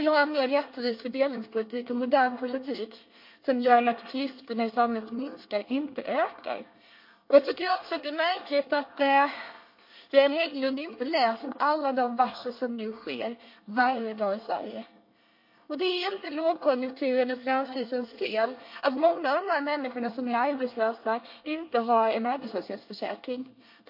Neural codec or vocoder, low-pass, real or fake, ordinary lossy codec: codec, 24 kHz, 1 kbps, SNAC; 5.4 kHz; fake; MP3, 32 kbps